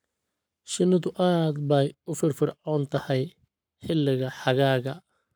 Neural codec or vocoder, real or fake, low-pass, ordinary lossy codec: codec, 44.1 kHz, 7.8 kbps, Pupu-Codec; fake; none; none